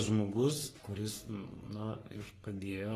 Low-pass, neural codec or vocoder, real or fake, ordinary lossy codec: 14.4 kHz; codec, 44.1 kHz, 3.4 kbps, Pupu-Codec; fake; AAC, 48 kbps